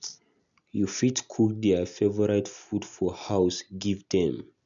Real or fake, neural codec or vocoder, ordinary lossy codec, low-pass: real; none; none; 7.2 kHz